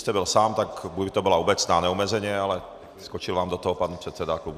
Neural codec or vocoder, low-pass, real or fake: none; 14.4 kHz; real